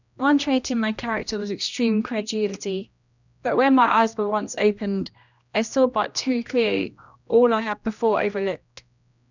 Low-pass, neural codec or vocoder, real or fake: 7.2 kHz; codec, 16 kHz, 1 kbps, X-Codec, HuBERT features, trained on general audio; fake